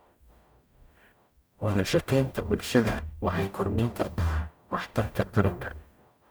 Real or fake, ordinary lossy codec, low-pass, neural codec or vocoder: fake; none; none; codec, 44.1 kHz, 0.9 kbps, DAC